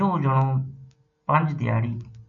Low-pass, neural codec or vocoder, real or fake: 7.2 kHz; none; real